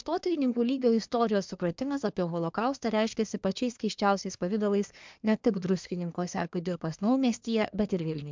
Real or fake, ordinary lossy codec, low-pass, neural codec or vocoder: fake; MP3, 64 kbps; 7.2 kHz; codec, 24 kHz, 1 kbps, SNAC